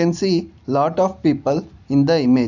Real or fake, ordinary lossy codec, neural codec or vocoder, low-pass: real; none; none; 7.2 kHz